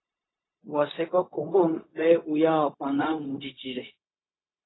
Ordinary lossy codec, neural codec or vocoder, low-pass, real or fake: AAC, 16 kbps; codec, 16 kHz, 0.4 kbps, LongCat-Audio-Codec; 7.2 kHz; fake